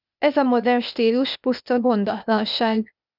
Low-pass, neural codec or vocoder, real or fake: 5.4 kHz; codec, 16 kHz, 0.8 kbps, ZipCodec; fake